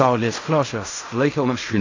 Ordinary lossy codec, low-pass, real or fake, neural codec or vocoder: AAC, 48 kbps; 7.2 kHz; fake; codec, 16 kHz in and 24 kHz out, 0.4 kbps, LongCat-Audio-Codec, fine tuned four codebook decoder